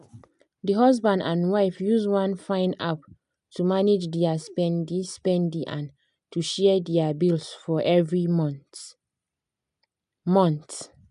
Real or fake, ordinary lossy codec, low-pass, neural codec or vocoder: real; none; 10.8 kHz; none